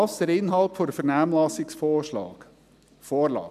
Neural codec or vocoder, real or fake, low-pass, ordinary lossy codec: none; real; 14.4 kHz; none